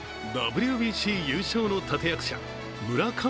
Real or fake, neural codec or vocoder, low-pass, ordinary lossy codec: real; none; none; none